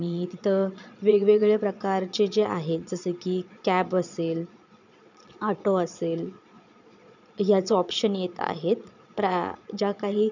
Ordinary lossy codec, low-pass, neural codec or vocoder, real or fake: none; 7.2 kHz; vocoder, 22.05 kHz, 80 mel bands, Vocos; fake